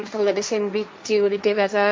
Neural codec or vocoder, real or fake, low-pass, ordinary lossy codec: codec, 16 kHz, 1.1 kbps, Voila-Tokenizer; fake; none; none